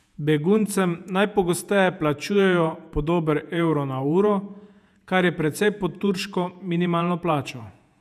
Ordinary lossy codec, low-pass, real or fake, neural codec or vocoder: none; 14.4 kHz; fake; vocoder, 48 kHz, 128 mel bands, Vocos